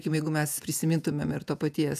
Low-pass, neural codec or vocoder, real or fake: 14.4 kHz; vocoder, 48 kHz, 128 mel bands, Vocos; fake